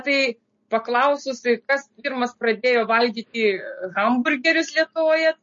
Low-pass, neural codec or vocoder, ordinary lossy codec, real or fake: 7.2 kHz; none; MP3, 32 kbps; real